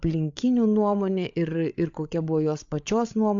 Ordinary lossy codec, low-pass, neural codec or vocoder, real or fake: AAC, 64 kbps; 7.2 kHz; codec, 16 kHz, 8 kbps, FreqCodec, larger model; fake